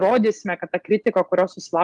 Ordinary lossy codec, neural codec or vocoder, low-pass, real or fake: Opus, 32 kbps; none; 10.8 kHz; real